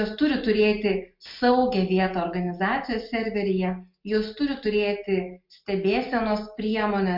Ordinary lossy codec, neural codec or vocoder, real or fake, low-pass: MP3, 48 kbps; none; real; 5.4 kHz